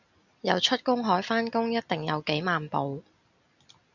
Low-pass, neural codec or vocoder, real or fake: 7.2 kHz; none; real